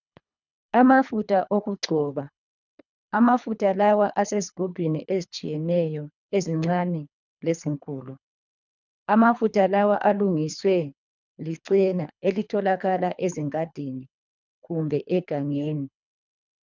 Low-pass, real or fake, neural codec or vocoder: 7.2 kHz; fake; codec, 24 kHz, 3 kbps, HILCodec